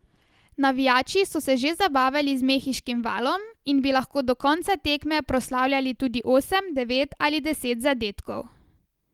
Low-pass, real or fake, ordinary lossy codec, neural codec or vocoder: 19.8 kHz; real; Opus, 24 kbps; none